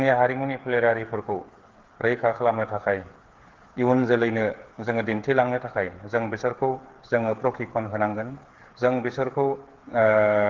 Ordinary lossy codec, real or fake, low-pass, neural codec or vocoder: Opus, 16 kbps; fake; 7.2 kHz; codec, 16 kHz, 8 kbps, FreqCodec, smaller model